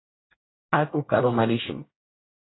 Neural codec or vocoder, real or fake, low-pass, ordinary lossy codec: codec, 24 kHz, 1 kbps, SNAC; fake; 7.2 kHz; AAC, 16 kbps